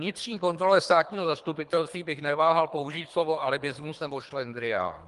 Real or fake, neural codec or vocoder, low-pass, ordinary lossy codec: fake; codec, 24 kHz, 3 kbps, HILCodec; 10.8 kHz; Opus, 32 kbps